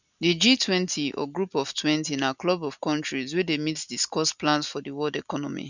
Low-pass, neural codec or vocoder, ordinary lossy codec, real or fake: 7.2 kHz; none; none; real